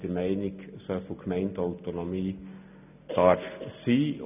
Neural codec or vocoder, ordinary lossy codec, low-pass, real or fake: none; none; 3.6 kHz; real